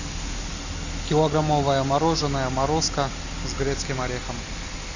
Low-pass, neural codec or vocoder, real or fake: 7.2 kHz; none; real